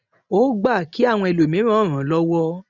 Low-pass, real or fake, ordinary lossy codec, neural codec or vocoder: 7.2 kHz; real; none; none